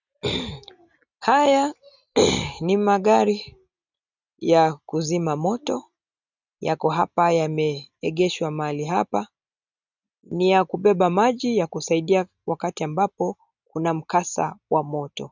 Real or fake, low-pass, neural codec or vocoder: real; 7.2 kHz; none